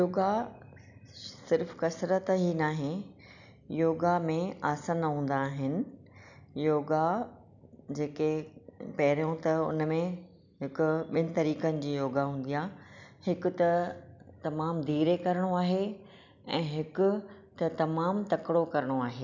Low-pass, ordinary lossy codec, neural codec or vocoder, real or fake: 7.2 kHz; none; none; real